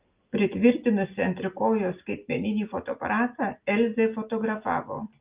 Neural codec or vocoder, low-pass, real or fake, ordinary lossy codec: none; 3.6 kHz; real; Opus, 32 kbps